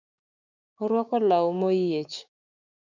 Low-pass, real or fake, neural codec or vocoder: 7.2 kHz; fake; codec, 16 kHz, 6 kbps, DAC